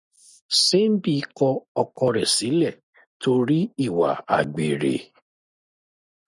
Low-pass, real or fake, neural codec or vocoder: 10.8 kHz; real; none